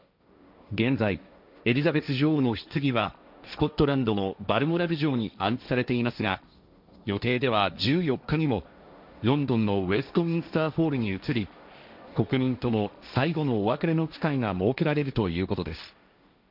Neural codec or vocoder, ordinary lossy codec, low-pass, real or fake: codec, 16 kHz, 1.1 kbps, Voila-Tokenizer; none; 5.4 kHz; fake